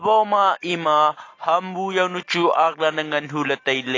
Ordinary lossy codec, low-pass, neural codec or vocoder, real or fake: AAC, 32 kbps; 7.2 kHz; none; real